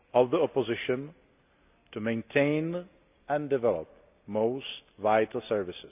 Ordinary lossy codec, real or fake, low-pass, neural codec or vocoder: none; real; 3.6 kHz; none